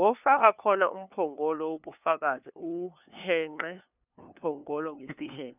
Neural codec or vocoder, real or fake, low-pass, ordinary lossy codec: codec, 16 kHz, 2 kbps, FunCodec, trained on LibriTTS, 25 frames a second; fake; 3.6 kHz; none